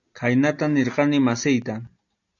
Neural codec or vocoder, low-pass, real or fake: none; 7.2 kHz; real